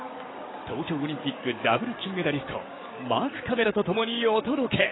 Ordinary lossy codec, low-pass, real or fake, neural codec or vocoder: AAC, 16 kbps; 7.2 kHz; fake; codec, 16 kHz, 6 kbps, DAC